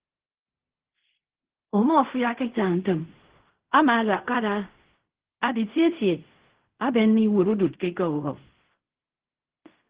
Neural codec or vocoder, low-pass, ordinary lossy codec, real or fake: codec, 16 kHz in and 24 kHz out, 0.4 kbps, LongCat-Audio-Codec, fine tuned four codebook decoder; 3.6 kHz; Opus, 16 kbps; fake